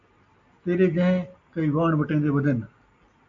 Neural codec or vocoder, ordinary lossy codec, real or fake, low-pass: none; MP3, 48 kbps; real; 7.2 kHz